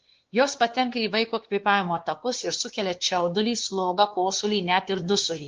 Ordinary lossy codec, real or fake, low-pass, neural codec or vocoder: Opus, 16 kbps; fake; 7.2 kHz; codec, 16 kHz, 2 kbps, X-Codec, WavLM features, trained on Multilingual LibriSpeech